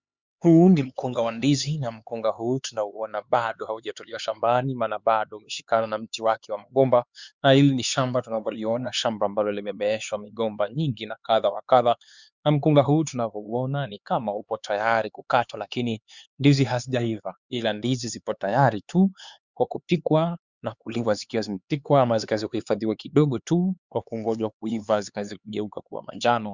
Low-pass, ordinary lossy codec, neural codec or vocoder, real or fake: 7.2 kHz; Opus, 64 kbps; codec, 16 kHz, 4 kbps, X-Codec, HuBERT features, trained on LibriSpeech; fake